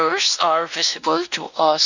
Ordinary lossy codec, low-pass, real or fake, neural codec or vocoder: none; 7.2 kHz; fake; codec, 16 kHz, 1 kbps, X-Codec, WavLM features, trained on Multilingual LibriSpeech